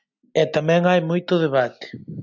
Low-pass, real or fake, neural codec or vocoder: 7.2 kHz; real; none